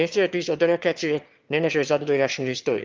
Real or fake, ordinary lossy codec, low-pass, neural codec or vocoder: fake; Opus, 32 kbps; 7.2 kHz; autoencoder, 22.05 kHz, a latent of 192 numbers a frame, VITS, trained on one speaker